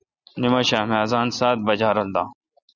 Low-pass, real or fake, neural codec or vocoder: 7.2 kHz; real; none